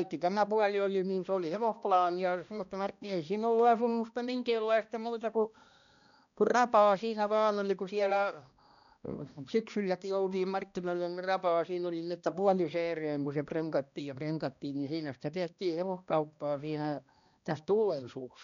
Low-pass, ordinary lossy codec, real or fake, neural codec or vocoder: 7.2 kHz; none; fake; codec, 16 kHz, 1 kbps, X-Codec, HuBERT features, trained on balanced general audio